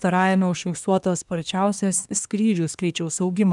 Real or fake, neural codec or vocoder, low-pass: fake; codec, 24 kHz, 1 kbps, SNAC; 10.8 kHz